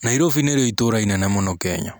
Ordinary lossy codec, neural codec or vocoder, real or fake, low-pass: none; none; real; none